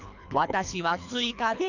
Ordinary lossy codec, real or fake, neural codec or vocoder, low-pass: none; fake; codec, 24 kHz, 3 kbps, HILCodec; 7.2 kHz